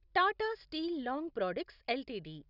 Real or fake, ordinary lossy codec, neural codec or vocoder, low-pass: real; none; none; 5.4 kHz